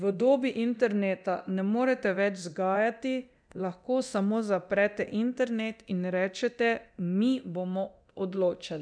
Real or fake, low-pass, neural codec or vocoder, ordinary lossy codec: fake; 9.9 kHz; codec, 24 kHz, 0.9 kbps, DualCodec; none